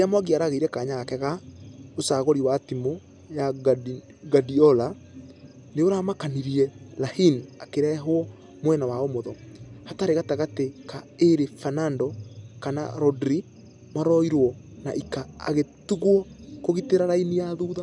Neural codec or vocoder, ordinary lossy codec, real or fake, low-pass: none; none; real; 10.8 kHz